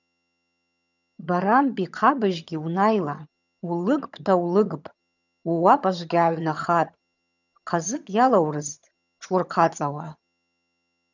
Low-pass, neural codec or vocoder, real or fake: 7.2 kHz; vocoder, 22.05 kHz, 80 mel bands, HiFi-GAN; fake